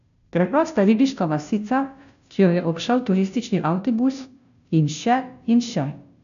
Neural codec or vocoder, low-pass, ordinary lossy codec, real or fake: codec, 16 kHz, 0.5 kbps, FunCodec, trained on Chinese and English, 25 frames a second; 7.2 kHz; none; fake